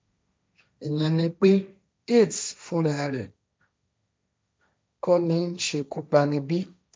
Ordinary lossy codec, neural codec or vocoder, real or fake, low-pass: none; codec, 16 kHz, 1.1 kbps, Voila-Tokenizer; fake; none